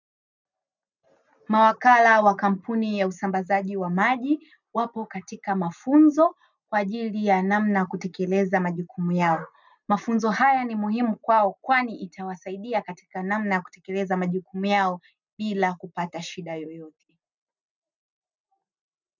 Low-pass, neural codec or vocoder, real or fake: 7.2 kHz; none; real